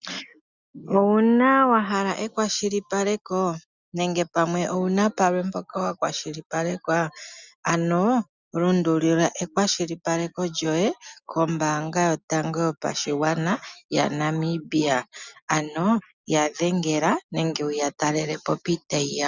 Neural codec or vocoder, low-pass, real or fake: none; 7.2 kHz; real